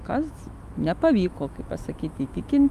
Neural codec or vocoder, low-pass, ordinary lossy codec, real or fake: autoencoder, 48 kHz, 128 numbers a frame, DAC-VAE, trained on Japanese speech; 14.4 kHz; Opus, 32 kbps; fake